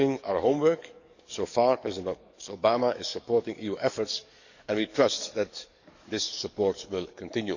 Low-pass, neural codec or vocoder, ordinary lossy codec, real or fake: 7.2 kHz; codec, 44.1 kHz, 7.8 kbps, DAC; none; fake